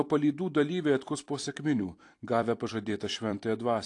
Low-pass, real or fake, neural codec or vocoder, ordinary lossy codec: 10.8 kHz; fake; vocoder, 44.1 kHz, 128 mel bands every 256 samples, BigVGAN v2; AAC, 48 kbps